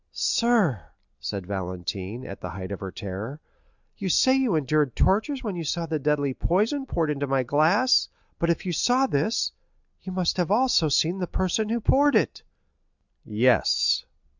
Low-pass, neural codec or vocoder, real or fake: 7.2 kHz; none; real